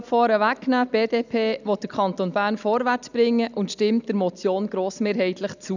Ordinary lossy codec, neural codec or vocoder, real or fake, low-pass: none; none; real; 7.2 kHz